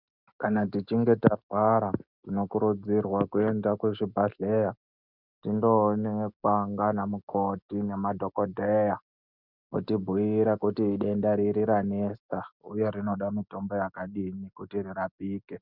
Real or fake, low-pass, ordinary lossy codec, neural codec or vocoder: real; 5.4 kHz; Opus, 64 kbps; none